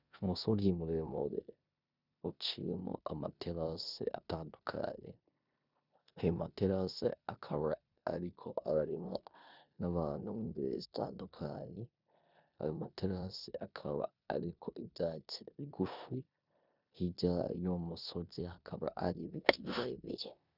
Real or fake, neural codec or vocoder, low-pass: fake; codec, 16 kHz in and 24 kHz out, 0.9 kbps, LongCat-Audio-Codec, four codebook decoder; 5.4 kHz